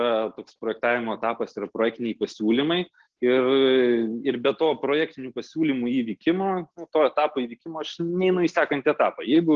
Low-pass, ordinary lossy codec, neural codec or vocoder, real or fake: 7.2 kHz; Opus, 16 kbps; none; real